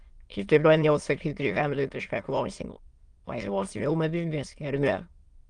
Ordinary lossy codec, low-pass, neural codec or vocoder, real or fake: Opus, 24 kbps; 9.9 kHz; autoencoder, 22.05 kHz, a latent of 192 numbers a frame, VITS, trained on many speakers; fake